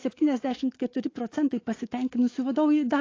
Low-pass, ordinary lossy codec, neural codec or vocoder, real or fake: 7.2 kHz; AAC, 32 kbps; autoencoder, 48 kHz, 128 numbers a frame, DAC-VAE, trained on Japanese speech; fake